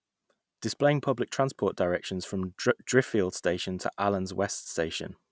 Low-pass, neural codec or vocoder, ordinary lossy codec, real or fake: none; none; none; real